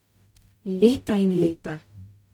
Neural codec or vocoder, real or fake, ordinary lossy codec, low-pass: codec, 44.1 kHz, 0.9 kbps, DAC; fake; none; 19.8 kHz